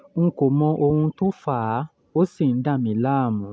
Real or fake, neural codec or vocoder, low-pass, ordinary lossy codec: real; none; none; none